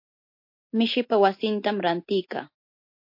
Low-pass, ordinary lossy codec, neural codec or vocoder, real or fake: 5.4 kHz; MP3, 32 kbps; none; real